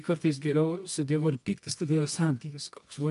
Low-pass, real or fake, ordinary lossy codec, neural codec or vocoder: 10.8 kHz; fake; MP3, 64 kbps; codec, 24 kHz, 0.9 kbps, WavTokenizer, medium music audio release